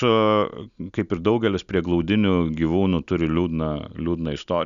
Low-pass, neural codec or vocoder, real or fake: 7.2 kHz; none; real